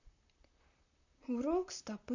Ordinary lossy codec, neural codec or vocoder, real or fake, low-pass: none; vocoder, 44.1 kHz, 128 mel bands, Pupu-Vocoder; fake; 7.2 kHz